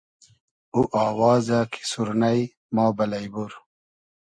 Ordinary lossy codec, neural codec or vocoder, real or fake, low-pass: MP3, 48 kbps; none; real; 9.9 kHz